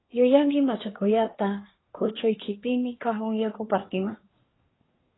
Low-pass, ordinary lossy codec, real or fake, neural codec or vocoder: 7.2 kHz; AAC, 16 kbps; fake; codec, 24 kHz, 1 kbps, SNAC